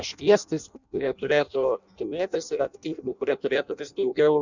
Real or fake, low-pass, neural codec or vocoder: fake; 7.2 kHz; codec, 16 kHz in and 24 kHz out, 0.6 kbps, FireRedTTS-2 codec